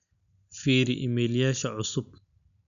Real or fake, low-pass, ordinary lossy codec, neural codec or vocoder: real; 7.2 kHz; none; none